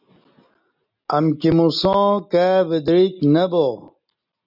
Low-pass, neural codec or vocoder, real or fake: 5.4 kHz; none; real